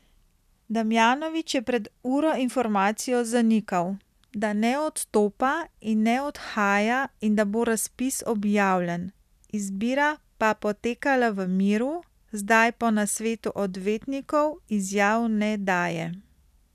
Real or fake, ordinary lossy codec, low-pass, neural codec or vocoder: real; none; 14.4 kHz; none